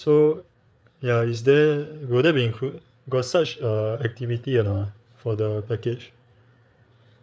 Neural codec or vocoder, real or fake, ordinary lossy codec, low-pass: codec, 16 kHz, 8 kbps, FreqCodec, larger model; fake; none; none